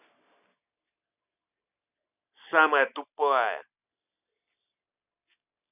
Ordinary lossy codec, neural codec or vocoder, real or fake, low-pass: none; none; real; 3.6 kHz